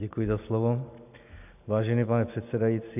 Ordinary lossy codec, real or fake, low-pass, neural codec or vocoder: AAC, 32 kbps; real; 3.6 kHz; none